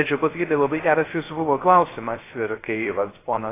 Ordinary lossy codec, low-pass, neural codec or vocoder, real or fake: AAC, 16 kbps; 3.6 kHz; codec, 16 kHz, 0.3 kbps, FocalCodec; fake